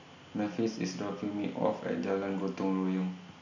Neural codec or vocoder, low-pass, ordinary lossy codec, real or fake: none; 7.2 kHz; none; real